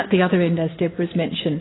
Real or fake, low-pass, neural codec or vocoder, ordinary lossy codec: real; 7.2 kHz; none; AAC, 16 kbps